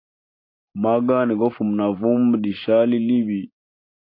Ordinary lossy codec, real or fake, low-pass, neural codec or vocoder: AAC, 32 kbps; real; 5.4 kHz; none